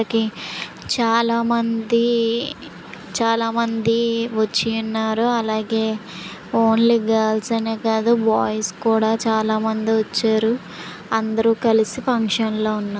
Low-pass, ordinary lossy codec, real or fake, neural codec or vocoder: none; none; real; none